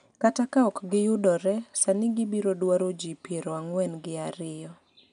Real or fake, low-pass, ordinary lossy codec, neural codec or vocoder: real; 9.9 kHz; none; none